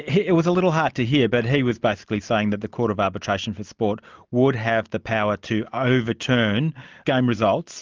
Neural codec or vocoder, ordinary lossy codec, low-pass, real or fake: none; Opus, 24 kbps; 7.2 kHz; real